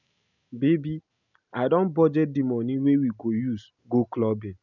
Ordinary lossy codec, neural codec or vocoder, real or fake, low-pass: none; none; real; 7.2 kHz